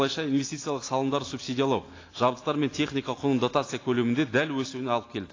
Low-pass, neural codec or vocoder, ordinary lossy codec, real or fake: 7.2 kHz; none; AAC, 32 kbps; real